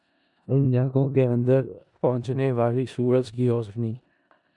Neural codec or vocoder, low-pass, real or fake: codec, 16 kHz in and 24 kHz out, 0.4 kbps, LongCat-Audio-Codec, four codebook decoder; 10.8 kHz; fake